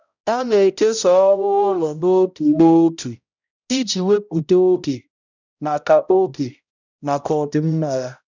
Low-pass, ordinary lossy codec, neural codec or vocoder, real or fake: 7.2 kHz; none; codec, 16 kHz, 0.5 kbps, X-Codec, HuBERT features, trained on balanced general audio; fake